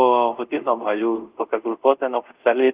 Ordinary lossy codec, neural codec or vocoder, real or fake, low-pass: Opus, 32 kbps; codec, 24 kHz, 0.5 kbps, DualCodec; fake; 3.6 kHz